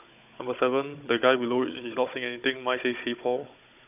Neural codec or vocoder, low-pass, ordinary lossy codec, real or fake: codec, 16 kHz, 16 kbps, FunCodec, trained on Chinese and English, 50 frames a second; 3.6 kHz; none; fake